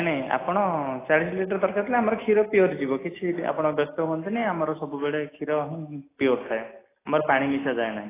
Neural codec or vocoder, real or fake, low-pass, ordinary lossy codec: none; real; 3.6 kHz; AAC, 16 kbps